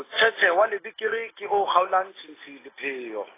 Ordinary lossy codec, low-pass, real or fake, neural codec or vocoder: AAC, 16 kbps; 3.6 kHz; real; none